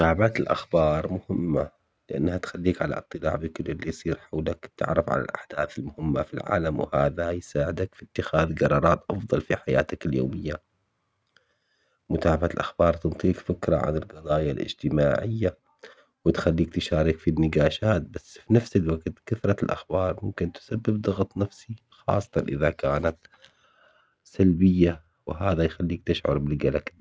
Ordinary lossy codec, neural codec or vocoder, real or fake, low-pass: none; none; real; none